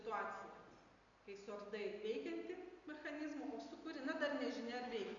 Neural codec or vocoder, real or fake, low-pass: none; real; 7.2 kHz